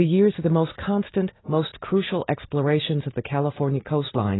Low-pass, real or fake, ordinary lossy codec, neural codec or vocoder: 7.2 kHz; real; AAC, 16 kbps; none